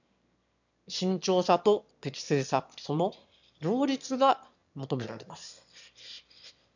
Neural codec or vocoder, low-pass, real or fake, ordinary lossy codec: autoencoder, 22.05 kHz, a latent of 192 numbers a frame, VITS, trained on one speaker; 7.2 kHz; fake; none